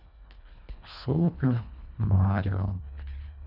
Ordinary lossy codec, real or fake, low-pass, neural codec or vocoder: none; fake; 5.4 kHz; codec, 24 kHz, 1.5 kbps, HILCodec